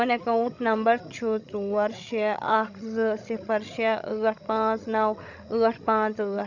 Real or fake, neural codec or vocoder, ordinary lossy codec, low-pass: fake; codec, 16 kHz, 16 kbps, FreqCodec, larger model; none; 7.2 kHz